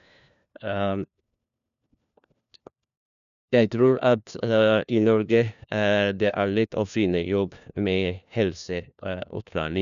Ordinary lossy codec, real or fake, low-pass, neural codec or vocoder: none; fake; 7.2 kHz; codec, 16 kHz, 1 kbps, FunCodec, trained on LibriTTS, 50 frames a second